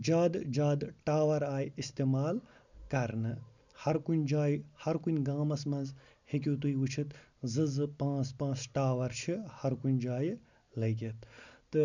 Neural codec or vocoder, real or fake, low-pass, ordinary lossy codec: none; real; 7.2 kHz; none